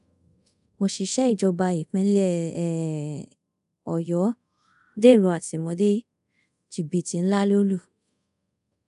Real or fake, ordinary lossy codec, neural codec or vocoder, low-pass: fake; none; codec, 24 kHz, 0.5 kbps, DualCodec; 10.8 kHz